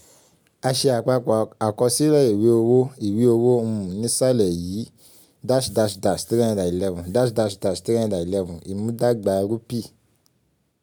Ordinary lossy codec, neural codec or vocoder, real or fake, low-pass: none; none; real; none